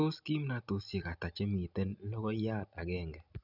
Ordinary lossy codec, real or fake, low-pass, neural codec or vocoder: none; real; 5.4 kHz; none